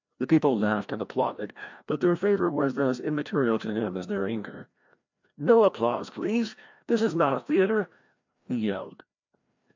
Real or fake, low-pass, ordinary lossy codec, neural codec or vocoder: fake; 7.2 kHz; MP3, 64 kbps; codec, 16 kHz, 1 kbps, FreqCodec, larger model